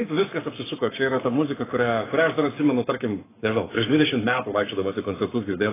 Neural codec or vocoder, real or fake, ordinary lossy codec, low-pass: codec, 44.1 kHz, 7.8 kbps, Pupu-Codec; fake; AAC, 16 kbps; 3.6 kHz